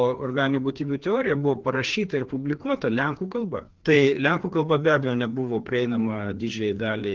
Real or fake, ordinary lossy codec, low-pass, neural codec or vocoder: fake; Opus, 16 kbps; 7.2 kHz; codec, 16 kHz, 2 kbps, FreqCodec, larger model